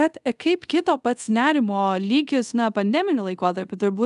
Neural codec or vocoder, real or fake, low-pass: codec, 24 kHz, 0.5 kbps, DualCodec; fake; 10.8 kHz